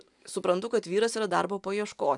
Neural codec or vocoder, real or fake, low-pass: none; real; 10.8 kHz